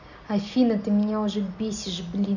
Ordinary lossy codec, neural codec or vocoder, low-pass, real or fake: none; none; 7.2 kHz; real